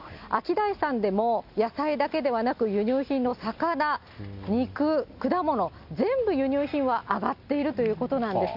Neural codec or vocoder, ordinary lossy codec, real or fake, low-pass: none; none; real; 5.4 kHz